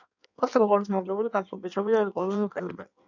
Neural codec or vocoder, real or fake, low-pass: codec, 16 kHz in and 24 kHz out, 1.1 kbps, FireRedTTS-2 codec; fake; 7.2 kHz